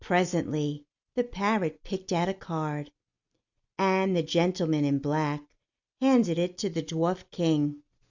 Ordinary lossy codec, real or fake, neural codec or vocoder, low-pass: Opus, 64 kbps; real; none; 7.2 kHz